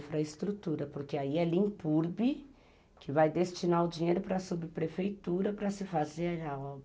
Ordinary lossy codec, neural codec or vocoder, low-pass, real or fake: none; none; none; real